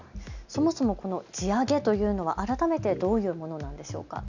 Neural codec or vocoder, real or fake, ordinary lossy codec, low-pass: none; real; none; 7.2 kHz